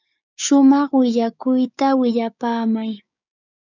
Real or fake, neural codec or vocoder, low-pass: fake; codec, 16 kHz, 6 kbps, DAC; 7.2 kHz